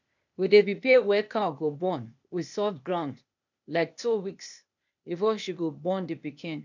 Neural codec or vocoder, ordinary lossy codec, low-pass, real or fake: codec, 16 kHz, 0.8 kbps, ZipCodec; none; 7.2 kHz; fake